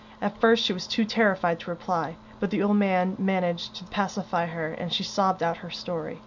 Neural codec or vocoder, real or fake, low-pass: none; real; 7.2 kHz